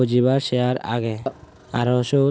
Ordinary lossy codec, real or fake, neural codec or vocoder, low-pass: none; real; none; none